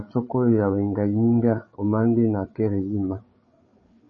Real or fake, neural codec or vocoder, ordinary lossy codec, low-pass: fake; codec, 16 kHz, 8 kbps, FreqCodec, larger model; MP3, 32 kbps; 7.2 kHz